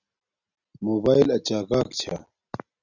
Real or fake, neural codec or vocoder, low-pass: real; none; 7.2 kHz